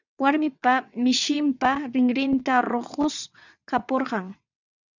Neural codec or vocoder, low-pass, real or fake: vocoder, 22.05 kHz, 80 mel bands, WaveNeXt; 7.2 kHz; fake